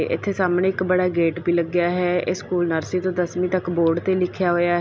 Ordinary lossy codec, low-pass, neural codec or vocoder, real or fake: none; none; none; real